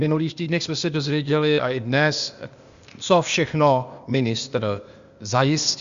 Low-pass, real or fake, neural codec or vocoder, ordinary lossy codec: 7.2 kHz; fake; codec, 16 kHz, 0.8 kbps, ZipCodec; Opus, 64 kbps